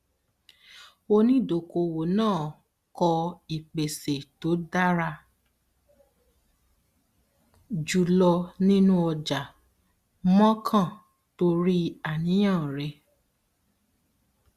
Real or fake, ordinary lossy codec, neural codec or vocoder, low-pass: real; Opus, 64 kbps; none; 14.4 kHz